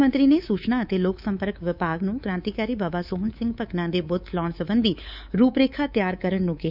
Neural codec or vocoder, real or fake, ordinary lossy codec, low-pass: codec, 24 kHz, 3.1 kbps, DualCodec; fake; none; 5.4 kHz